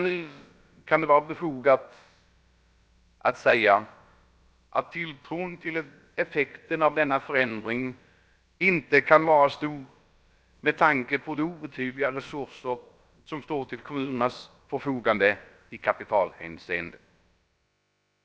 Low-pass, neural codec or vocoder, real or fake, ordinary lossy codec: none; codec, 16 kHz, about 1 kbps, DyCAST, with the encoder's durations; fake; none